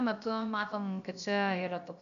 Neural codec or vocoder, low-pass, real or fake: codec, 16 kHz, about 1 kbps, DyCAST, with the encoder's durations; 7.2 kHz; fake